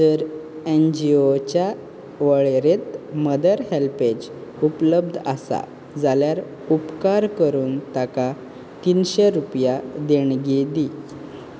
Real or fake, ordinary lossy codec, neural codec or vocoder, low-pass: real; none; none; none